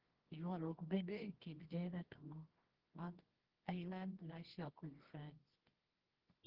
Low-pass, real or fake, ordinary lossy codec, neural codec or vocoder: 5.4 kHz; fake; Opus, 16 kbps; codec, 24 kHz, 0.9 kbps, WavTokenizer, medium music audio release